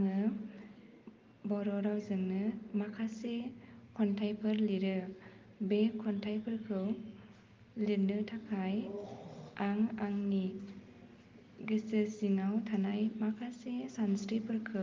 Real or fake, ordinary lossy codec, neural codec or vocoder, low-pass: real; Opus, 32 kbps; none; 7.2 kHz